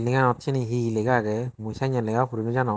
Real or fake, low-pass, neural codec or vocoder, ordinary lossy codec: real; none; none; none